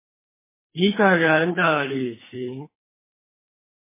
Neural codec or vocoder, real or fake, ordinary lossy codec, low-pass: codec, 24 kHz, 3 kbps, HILCodec; fake; MP3, 16 kbps; 3.6 kHz